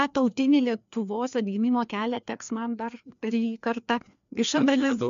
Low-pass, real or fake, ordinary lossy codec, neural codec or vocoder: 7.2 kHz; fake; MP3, 64 kbps; codec, 16 kHz, 2 kbps, FreqCodec, larger model